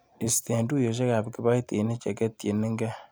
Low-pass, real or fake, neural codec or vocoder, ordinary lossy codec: none; fake; vocoder, 44.1 kHz, 128 mel bands every 256 samples, BigVGAN v2; none